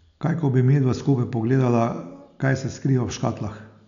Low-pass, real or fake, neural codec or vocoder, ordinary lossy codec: 7.2 kHz; real; none; none